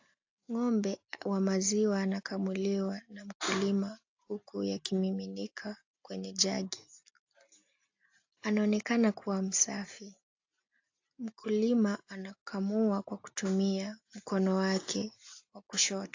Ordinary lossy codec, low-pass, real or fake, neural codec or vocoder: AAC, 48 kbps; 7.2 kHz; real; none